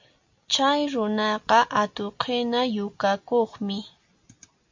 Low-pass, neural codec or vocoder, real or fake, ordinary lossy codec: 7.2 kHz; none; real; MP3, 48 kbps